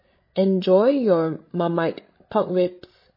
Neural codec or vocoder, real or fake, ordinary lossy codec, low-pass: codec, 16 kHz, 16 kbps, FreqCodec, larger model; fake; MP3, 24 kbps; 5.4 kHz